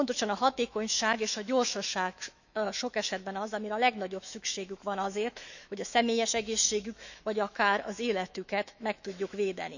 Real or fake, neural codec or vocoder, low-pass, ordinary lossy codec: fake; autoencoder, 48 kHz, 128 numbers a frame, DAC-VAE, trained on Japanese speech; 7.2 kHz; none